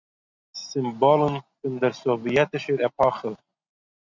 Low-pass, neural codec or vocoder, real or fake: 7.2 kHz; none; real